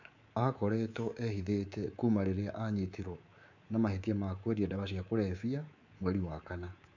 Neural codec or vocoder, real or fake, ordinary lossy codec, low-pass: codec, 24 kHz, 3.1 kbps, DualCodec; fake; none; 7.2 kHz